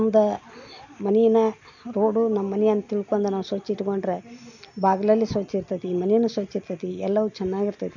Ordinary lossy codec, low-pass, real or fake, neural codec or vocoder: MP3, 48 kbps; 7.2 kHz; real; none